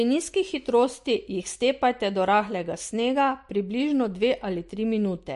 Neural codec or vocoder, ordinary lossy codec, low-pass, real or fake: none; MP3, 48 kbps; 14.4 kHz; real